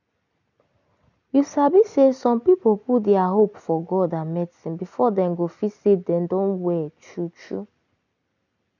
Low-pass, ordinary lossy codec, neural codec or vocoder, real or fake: 7.2 kHz; none; none; real